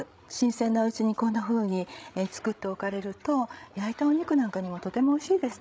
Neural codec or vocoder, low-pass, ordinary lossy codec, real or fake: codec, 16 kHz, 16 kbps, FreqCodec, larger model; none; none; fake